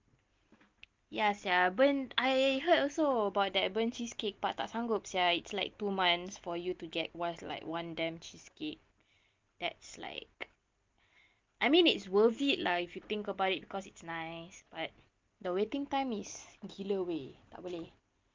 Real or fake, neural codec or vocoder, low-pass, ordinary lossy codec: real; none; 7.2 kHz; Opus, 24 kbps